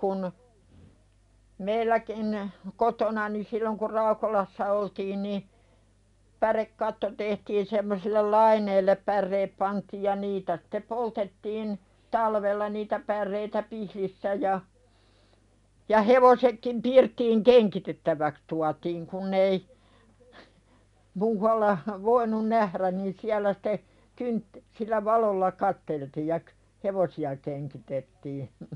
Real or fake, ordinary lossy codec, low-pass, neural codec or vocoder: real; MP3, 96 kbps; 10.8 kHz; none